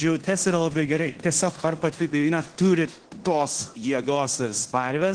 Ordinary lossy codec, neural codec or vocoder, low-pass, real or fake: Opus, 16 kbps; codec, 16 kHz in and 24 kHz out, 0.9 kbps, LongCat-Audio-Codec, fine tuned four codebook decoder; 9.9 kHz; fake